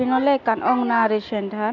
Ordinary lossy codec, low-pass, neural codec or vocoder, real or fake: none; 7.2 kHz; none; real